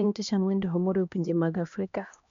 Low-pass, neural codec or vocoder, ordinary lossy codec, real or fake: 7.2 kHz; codec, 16 kHz, 1 kbps, X-Codec, HuBERT features, trained on LibriSpeech; MP3, 64 kbps; fake